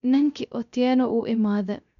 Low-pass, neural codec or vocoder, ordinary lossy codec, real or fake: 7.2 kHz; codec, 16 kHz, 0.3 kbps, FocalCodec; none; fake